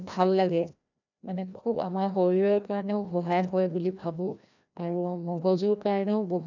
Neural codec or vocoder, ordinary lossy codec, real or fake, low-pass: codec, 16 kHz, 1 kbps, FreqCodec, larger model; none; fake; 7.2 kHz